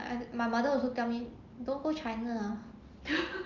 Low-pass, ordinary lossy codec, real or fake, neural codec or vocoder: 7.2 kHz; Opus, 24 kbps; real; none